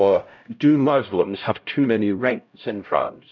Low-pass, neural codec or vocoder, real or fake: 7.2 kHz; codec, 16 kHz, 0.5 kbps, X-Codec, HuBERT features, trained on LibriSpeech; fake